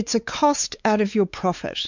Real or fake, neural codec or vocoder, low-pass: fake; codec, 16 kHz, 4 kbps, X-Codec, WavLM features, trained on Multilingual LibriSpeech; 7.2 kHz